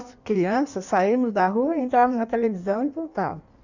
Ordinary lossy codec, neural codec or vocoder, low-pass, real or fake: none; codec, 16 kHz in and 24 kHz out, 1.1 kbps, FireRedTTS-2 codec; 7.2 kHz; fake